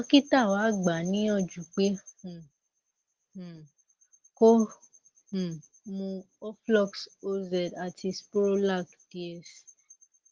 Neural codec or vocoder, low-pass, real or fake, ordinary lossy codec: none; 7.2 kHz; real; Opus, 16 kbps